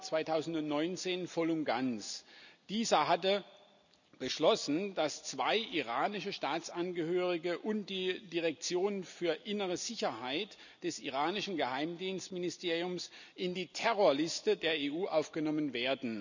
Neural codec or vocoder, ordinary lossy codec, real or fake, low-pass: none; none; real; 7.2 kHz